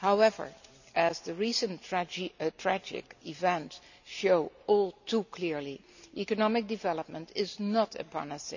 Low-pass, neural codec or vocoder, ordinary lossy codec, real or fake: 7.2 kHz; none; none; real